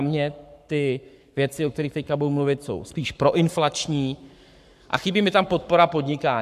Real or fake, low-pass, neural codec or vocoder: fake; 14.4 kHz; codec, 44.1 kHz, 7.8 kbps, Pupu-Codec